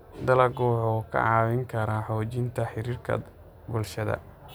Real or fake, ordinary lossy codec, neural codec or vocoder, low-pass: real; none; none; none